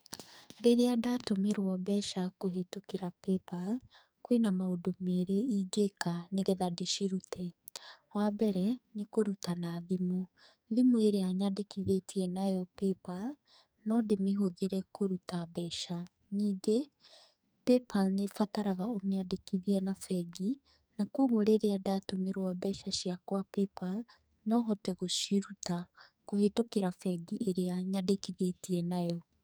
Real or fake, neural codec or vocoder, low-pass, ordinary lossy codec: fake; codec, 44.1 kHz, 2.6 kbps, SNAC; none; none